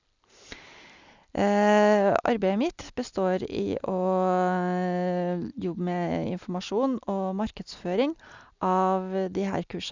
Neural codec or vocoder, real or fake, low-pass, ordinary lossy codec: none; real; 7.2 kHz; Opus, 64 kbps